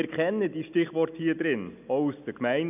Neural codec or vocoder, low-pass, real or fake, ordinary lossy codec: none; 3.6 kHz; real; none